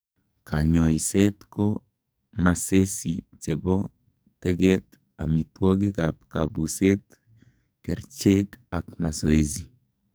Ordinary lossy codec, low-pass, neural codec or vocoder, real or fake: none; none; codec, 44.1 kHz, 2.6 kbps, SNAC; fake